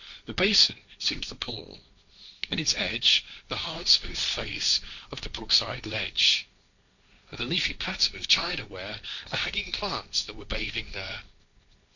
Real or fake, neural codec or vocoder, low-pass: fake; codec, 16 kHz, 1.1 kbps, Voila-Tokenizer; 7.2 kHz